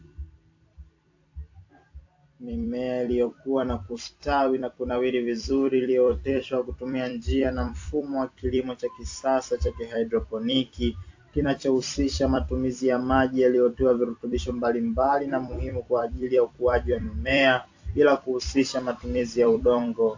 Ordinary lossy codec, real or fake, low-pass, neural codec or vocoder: AAC, 48 kbps; fake; 7.2 kHz; vocoder, 44.1 kHz, 128 mel bands every 256 samples, BigVGAN v2